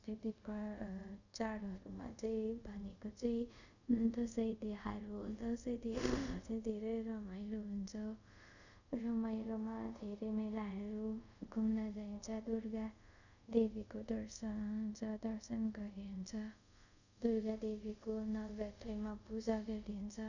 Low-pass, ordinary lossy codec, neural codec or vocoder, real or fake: 7.2 kHz; none; codec, 24 kHz, 0.5 kbps, DualCodec; fake